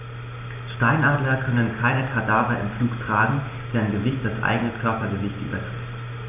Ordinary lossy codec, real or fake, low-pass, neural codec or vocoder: none; real; 3.6 kHz; none